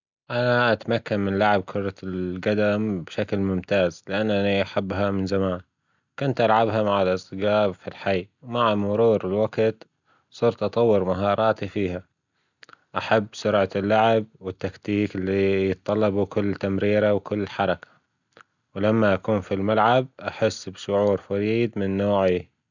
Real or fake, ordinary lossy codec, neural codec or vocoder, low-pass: real; none; none; 7.2 kHz